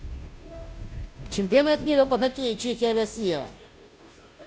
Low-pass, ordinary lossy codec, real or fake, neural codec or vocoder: none; none; fake; codec, 16 kHz, 0.5 kbps, FunCodec, trained on Chinese and English, 25 frames a second